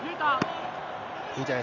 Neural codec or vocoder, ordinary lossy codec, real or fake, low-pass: none; none; real; 7.2 kHz